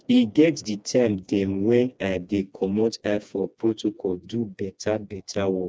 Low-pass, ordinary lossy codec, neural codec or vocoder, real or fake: none; none; codec, 16 kHz, 2 kbps, FreqCodec, smaller model; fake